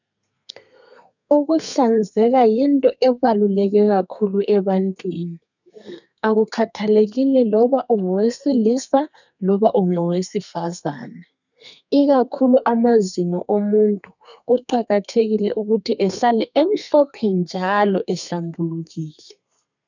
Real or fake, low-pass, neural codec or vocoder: fake; 7.2 kHz; codec, 32 kHz, 1.9 kbps, SNAC